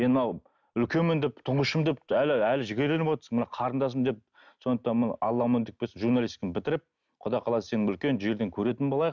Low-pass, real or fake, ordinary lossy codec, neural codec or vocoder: 7.2 kHz; real; none; none